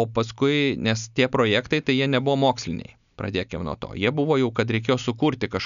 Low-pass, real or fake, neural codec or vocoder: 7.2 kHz; real; none